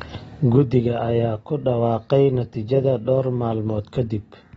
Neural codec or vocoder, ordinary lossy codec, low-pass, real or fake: none; AAC, 24 kbps; 19.8 kHz; real